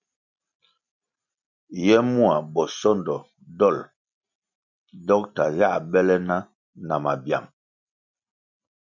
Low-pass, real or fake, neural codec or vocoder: 7.2 kHz; real; none